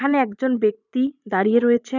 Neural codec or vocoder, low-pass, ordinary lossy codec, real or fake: vocoder, 44.1 kHz, 80 mel bands, Vocos; 7.2 kHz; none; fake